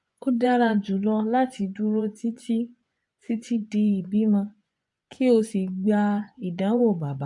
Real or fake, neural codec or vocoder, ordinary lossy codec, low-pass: fake; vocoder, 24 kHz, 100 mel bands, Vocos; MP3, 64 kbps; 10.8 kHz